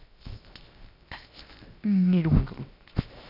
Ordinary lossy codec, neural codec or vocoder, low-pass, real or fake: none; codec, 16 kHz, 0.7 kbps, FocalCodec; 5.4 kHz; fake